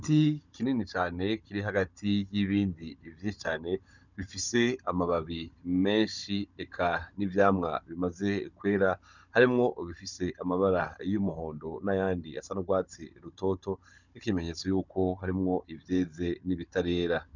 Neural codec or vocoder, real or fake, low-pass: codec, 16 kHz, 16 kbps, FunCodec, trained on Chinese and English, 50 frames a second; fake; 7.2 kHz